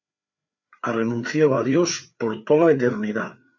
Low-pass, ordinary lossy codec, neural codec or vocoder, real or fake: 7.2 kHz; MP3, 64 kbps; codec, 16 kHz, 4 kbps, FreqCodec, larger model; fake